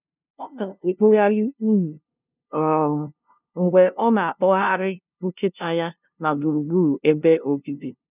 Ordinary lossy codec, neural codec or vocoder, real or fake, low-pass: none; codec, 16 kHz, 0.5 kbps, FunCodec, trained on LibriTTS, 25 frames a second; fake; 3.6 kHz